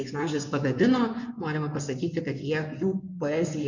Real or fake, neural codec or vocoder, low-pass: fake; codec, 16 kHz in and 24 kHz out, 2.2 kbps, FireRedTTS-2 codec; 7.2 kHz